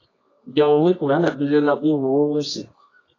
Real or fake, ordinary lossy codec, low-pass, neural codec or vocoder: fake; AAC, 32 kbps; 7.2 kHz; codec, 24 kHz, 0.9 kbps, WavTokenizer, medium music audio release